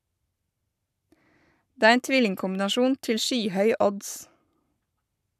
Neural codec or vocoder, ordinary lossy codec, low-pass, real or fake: codec, 44.1 kHz, 7.8 kbps, Pupu-Codec; none; 14.4 kHz; fake